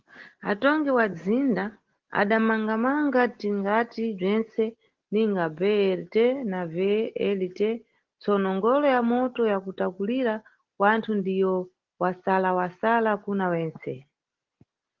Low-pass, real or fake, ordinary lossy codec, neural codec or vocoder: 7.2 kHz; real; Opus, 16 kbps; none